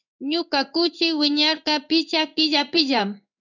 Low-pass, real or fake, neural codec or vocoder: 7.2 kHz; fake; codec, 16 kHz in and 24 kHz out, 1 kbps, XY-Tokenizer